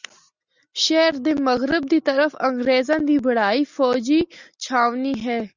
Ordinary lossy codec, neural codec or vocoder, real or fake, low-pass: Opus, 64 kbps; none; real; 7.2 kHz